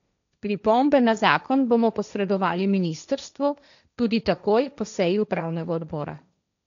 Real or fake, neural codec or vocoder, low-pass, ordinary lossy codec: fake; codec, 16 kHz, 1.1 kbps, Voila-Tokenizer; 7.2 kHz; none